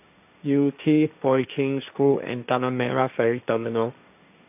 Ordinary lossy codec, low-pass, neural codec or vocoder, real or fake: none; 3.6 kHz; codec, 16 kHz, 1.1 kbps, Voila-Tokenizer; fake